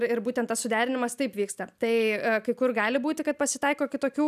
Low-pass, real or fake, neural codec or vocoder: 14.4 kHz; real; none